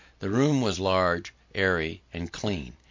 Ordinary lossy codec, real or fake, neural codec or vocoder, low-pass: MP3, 48 kbps; real; none; 7.2 kHz